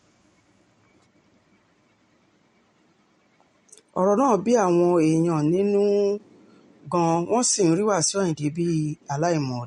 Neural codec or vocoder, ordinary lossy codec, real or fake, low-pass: none; MP3, 48 kbps; real; 19.8 kHz